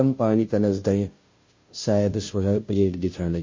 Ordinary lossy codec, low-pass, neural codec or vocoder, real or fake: MP3, 32 kbps; 7.2 kHz; codec, 16 kHz, 0.5 kbps, FunCodec, trained on Chinese and English, 25 frames a second; fake